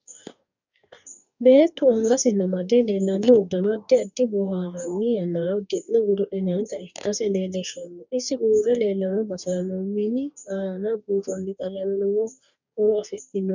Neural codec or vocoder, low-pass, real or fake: codec, 44.1 kHz, 2.6 kbps, DAC; 7.2 kHz; fake